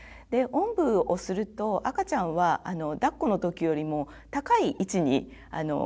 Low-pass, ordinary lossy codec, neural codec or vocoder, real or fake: none; none; none; real